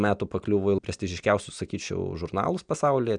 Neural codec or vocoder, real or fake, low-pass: none; real; 9.9 kHz